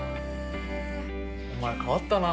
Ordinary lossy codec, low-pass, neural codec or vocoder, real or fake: none; none; none; real